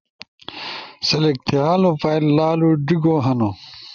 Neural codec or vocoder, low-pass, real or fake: none; 7.2 kHz; real